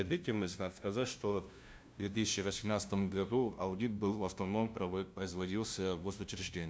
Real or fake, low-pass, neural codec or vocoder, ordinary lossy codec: fake; none; codec, 16 kHz, 0.5 kbps, FunCodec, trained on LibriTTS, 25 frames a second; none